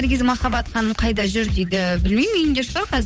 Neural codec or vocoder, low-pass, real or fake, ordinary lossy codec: codec, 16 kHz, 8 kbps, FunCodec, trained on Chinese and English, 25 frames a second; none; fake; none